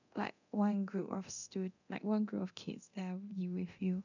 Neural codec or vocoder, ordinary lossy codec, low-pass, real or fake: codec, 24 kHz, 0.9 kbps, DualCodec; none; 7.2 kHz; fake